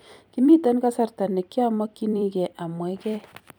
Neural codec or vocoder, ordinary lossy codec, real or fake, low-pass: vocoder, 44.1 kHz, 128 mel bands every 256 samples, BigVGAN v2; none; fake; none